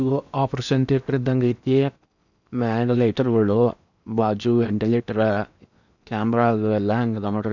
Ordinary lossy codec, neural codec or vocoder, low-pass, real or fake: none; codec, 16 kHz in and 24 kHz out, 0.8 kbps, FocalCodec, streaming, 65536 codes; 7.2 kHz; fake